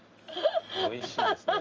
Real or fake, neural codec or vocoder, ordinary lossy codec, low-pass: real; none; Opus, 24 kbps; 7.2 kHz